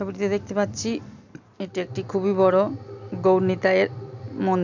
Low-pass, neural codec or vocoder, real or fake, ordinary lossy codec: 7.2 kHz; none; real; none